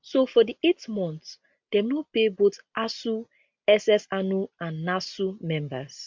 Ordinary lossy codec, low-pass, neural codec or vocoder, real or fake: MP3, 64 kbps; 7.2 kHz; none; real